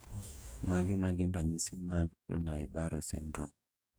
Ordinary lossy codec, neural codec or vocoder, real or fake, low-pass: none; codec, 44.1 kHz, 2.6 kbps, DAC; fake; none